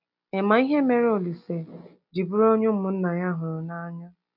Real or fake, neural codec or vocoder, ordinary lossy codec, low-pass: real; none; none; 5.4 kHz